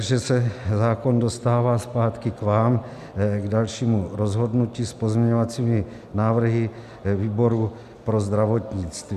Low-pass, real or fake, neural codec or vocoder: 14.4 kHz; real; none